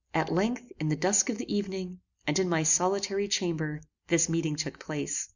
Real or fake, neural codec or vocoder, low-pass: real; none; 7.2 kHz